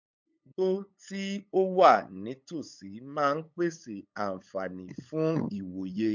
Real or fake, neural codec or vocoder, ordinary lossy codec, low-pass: fake; codec, 16 kHz, 8 kbps, FunCodec, trained on LibriTTS, 25 frames a second; MP3, 48 kbps; 7.2 kHz